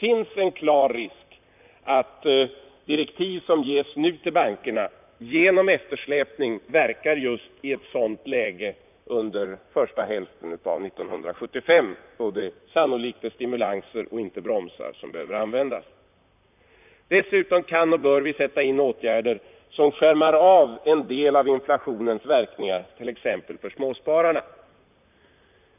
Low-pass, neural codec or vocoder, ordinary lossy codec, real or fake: 3.6 kHz; vocoder, 44.1 kHz, 128 mel bands, Pupu-Vocoder; none; fake